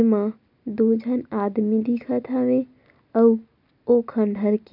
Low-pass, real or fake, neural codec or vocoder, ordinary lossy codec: 5.4 kHz; real; none; none